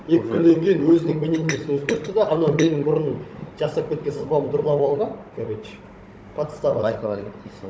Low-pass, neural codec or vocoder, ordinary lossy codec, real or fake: none; codec, 16 kHz, 16 kbps, FunCodec, trained on Chinese and English, 50 frames a second; none; fake